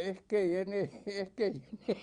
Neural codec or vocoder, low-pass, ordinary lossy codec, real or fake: vocoder, 22.05 kHz, 80 mel bands, Vocos; 9.9 kHz; Opus, 64 kbps; fake